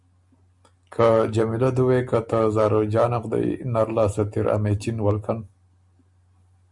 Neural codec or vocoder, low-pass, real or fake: none; 10.8 kHz; real